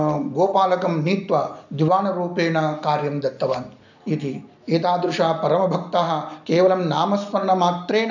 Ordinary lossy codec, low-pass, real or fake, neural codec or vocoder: none; 7.2 kHz; real; none